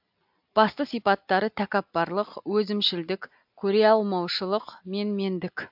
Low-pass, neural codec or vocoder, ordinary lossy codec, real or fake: 5.4 kHz; none; AAC, 48 kbps; real